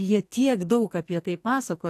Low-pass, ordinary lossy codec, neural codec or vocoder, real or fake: 14.4 kHz; AAC, 64 kbps; codec, 44.1 kHz, 2.6 kbps, SNAC; fake